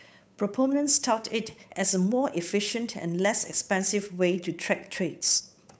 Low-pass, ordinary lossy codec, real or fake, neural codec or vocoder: none; none; fake; codec, 16 kHz, 8 kbps, FunCodec, trained on Chinese and English, 25 frames a second